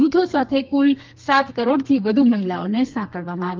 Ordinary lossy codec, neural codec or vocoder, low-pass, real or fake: Opus, 24 kbps; codec, 32 kHz, 1.9 kbps, SNAC; 7.2 kHz; fake